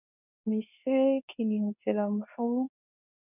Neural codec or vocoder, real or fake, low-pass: codec, 24 kHz, 0.9 kbps, WavTokenizer, medium speech release version 2; fake; 3.6 kHz